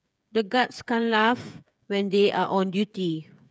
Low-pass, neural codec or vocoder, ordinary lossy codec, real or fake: none; codec, 16 kHz, 8 kbps, FreqCodec, smaller model; none; fake